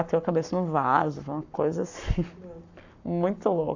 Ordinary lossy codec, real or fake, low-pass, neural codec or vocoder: AAC, 48 kbps; fake; 7.2 kHz; codec, 44.1 kHz, 7.8 kbps, Pupu-Codec